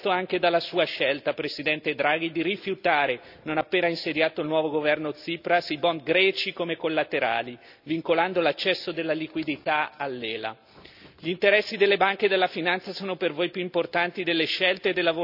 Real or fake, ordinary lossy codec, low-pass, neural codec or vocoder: real; none; 5.4 kHz; none